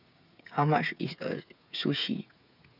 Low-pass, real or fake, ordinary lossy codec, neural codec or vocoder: 5.4 kHz; fake; none; codec, 16 kHz, 8 kbps, FreqCodec, smaller model